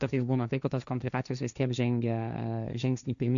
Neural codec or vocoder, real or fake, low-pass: codec, 16 kHz, 1.1 kbps, Voila-Tokenizer; fake; 7.2 kHz